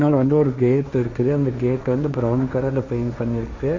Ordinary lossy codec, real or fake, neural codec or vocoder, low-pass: MP3, 48 kbps; fake; codec, 16 kHz, 1.1 kbps, Voila-Tokenizer; 7.2 kHz